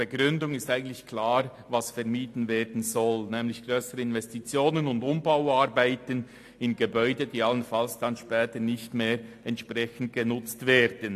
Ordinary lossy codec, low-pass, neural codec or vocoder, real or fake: AAC, 64 kbps; 14.4 kHz; none; real